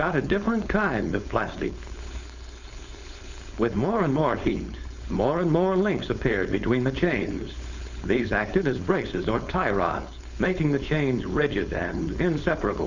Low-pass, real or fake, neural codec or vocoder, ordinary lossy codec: 7.2 kHz; fake; codec, 16 kHz, 4.8 kbps, FACodec; Opus, 64 kbps